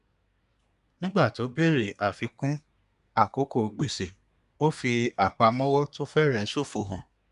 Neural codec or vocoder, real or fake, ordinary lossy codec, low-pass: codec, 24 kHz, 1 kbps, SNAC; fake; none; 10.8 kHz